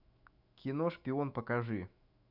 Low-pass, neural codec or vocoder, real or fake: 5.4 kHz; autoencoder, 48 kHz, 128 numbers a frame, DAC-VAE, trained on Japanese speech; fake